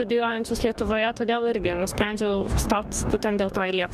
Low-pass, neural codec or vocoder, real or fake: 14.4 kHz; codec, 44.1 kHz, 2.6 kbps, DAC; fake